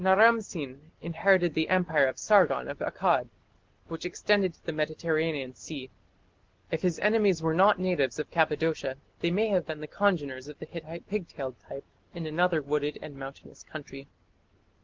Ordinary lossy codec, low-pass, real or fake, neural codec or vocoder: Opus, 16 kbps; 7.2 kHz; real; none